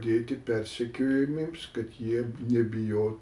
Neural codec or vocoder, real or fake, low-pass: none; real; 10.8 kHz